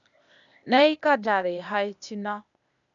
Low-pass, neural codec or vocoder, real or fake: 7.2 kHz; codec, 16 kHz, 0.8 kbps, ZipCodec; fake